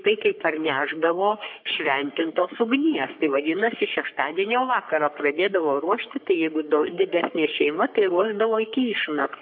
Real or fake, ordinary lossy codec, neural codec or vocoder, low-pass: fake; MP3, 48 kbps; codec, 44.1 kHz, 3.4 kbps, Pupu-Codec; 5.4 kHz